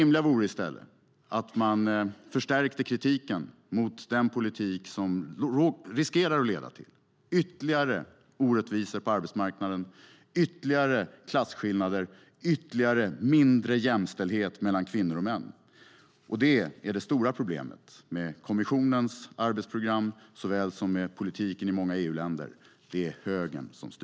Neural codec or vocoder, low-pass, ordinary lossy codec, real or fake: none; none; none; real